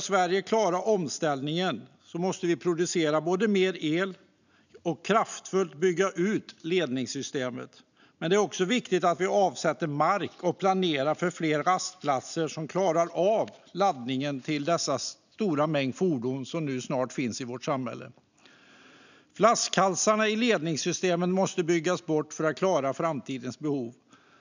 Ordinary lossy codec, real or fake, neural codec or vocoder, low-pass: none; real; none; 7.2 kHz